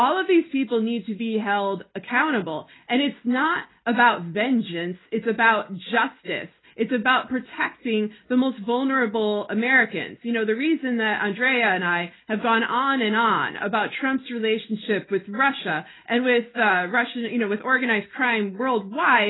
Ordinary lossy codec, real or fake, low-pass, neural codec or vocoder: AAC, 16 kbps; real; 7.2 kHz; none